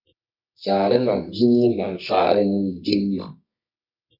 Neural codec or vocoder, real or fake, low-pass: codec, 24 kHz, 0.9 kbps, WavTokenizer, medium music audio release; fake; 5.4 kHz